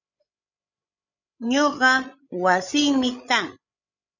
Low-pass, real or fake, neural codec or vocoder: 7.2 kHz; fake; codec, 16 kHz, 16 kbps, FreqCodec, larger model